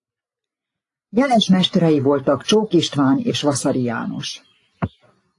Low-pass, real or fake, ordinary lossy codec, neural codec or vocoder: 10.8 kHz; real; AAC, 48 kbps; none